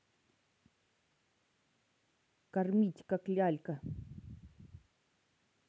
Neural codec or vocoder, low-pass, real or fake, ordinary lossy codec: none; none; real; none